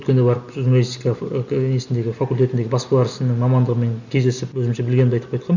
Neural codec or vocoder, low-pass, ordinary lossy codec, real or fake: none; 7.2 kHz; none; real